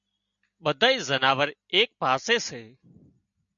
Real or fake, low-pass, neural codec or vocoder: real; 7.2 kHz; none